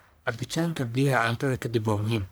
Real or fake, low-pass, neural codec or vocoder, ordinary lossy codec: fake; none; codec, 44.1 kHz, 1.7 kbps, Pupu-Codec; none